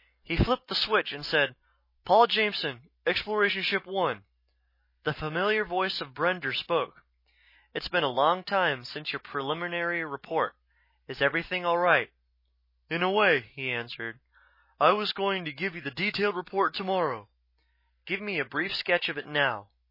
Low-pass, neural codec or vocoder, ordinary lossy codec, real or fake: 5.4 kHz; none; MP3, 24 kbps; real